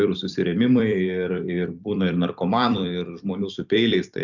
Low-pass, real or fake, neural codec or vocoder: 7.2 kHz; fake; vocoder, 44.1 kHz, 128 mel bands every 256 samples, BigVGAN v2